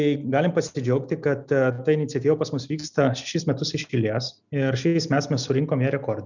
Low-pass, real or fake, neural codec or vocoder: 7.2 kHz; real; none